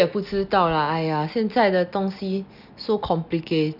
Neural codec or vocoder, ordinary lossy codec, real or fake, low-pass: none; none; real; 5.4 kHz